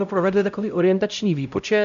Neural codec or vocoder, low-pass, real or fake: codec, 16 kHz, 0.5 kbps, X-Codec, HuBERT features, trained on LibriSpeech; 7.2 kHz; fake